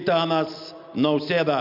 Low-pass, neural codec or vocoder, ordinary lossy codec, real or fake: 5.4 kHz; none; MP3, 48 kbps; real